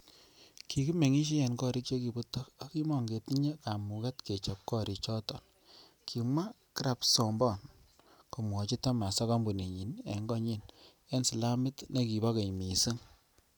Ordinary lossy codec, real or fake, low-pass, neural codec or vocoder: none; real; none; none